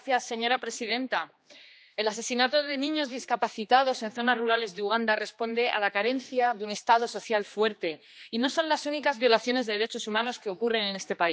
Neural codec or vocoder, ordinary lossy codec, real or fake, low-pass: codec, 16 kHz, 2 kbps, X-Codec, HuBERT features, trained on general audio; none; fake; none